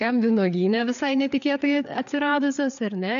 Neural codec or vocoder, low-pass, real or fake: codec, 16 kHz, 4 kbps, FreqCodec, larger model; 7.2 kHz; fake